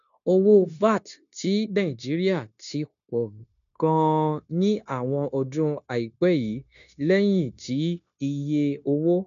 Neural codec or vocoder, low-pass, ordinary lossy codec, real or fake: codec, 16 kHz, 0.9 kbps, LongCat-Audio-Codec; 7.2 kHz; none; fake